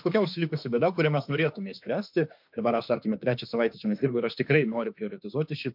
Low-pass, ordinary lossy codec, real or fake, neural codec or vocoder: 5.4 kHz; MP3, 48 kbps; fake; autoencoder, 48 kHz, 32 numbers a frame, DAC-VAE, trained on Japanese speech